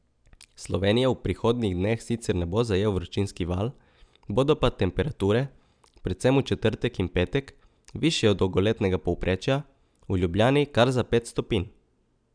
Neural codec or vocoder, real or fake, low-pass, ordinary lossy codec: none; real; 9.9 kHz; none